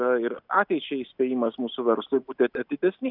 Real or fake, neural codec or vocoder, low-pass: real; none; 5.4 kHz